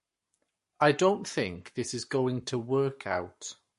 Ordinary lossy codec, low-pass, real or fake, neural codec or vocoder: MP3, 48 kbps; 14.4 kHz; fake; codec, 44.1 kHz, 7.8 kbps, Pupu-Codec